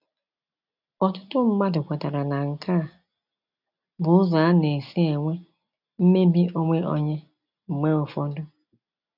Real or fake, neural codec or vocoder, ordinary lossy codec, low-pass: real; none; none; 5.4 kHz